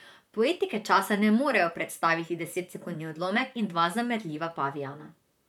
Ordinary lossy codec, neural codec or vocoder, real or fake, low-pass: none; vocoder, 44.1 kHz, 128 mel bands, Pupu-Vocoder; fake; 19.8 kHz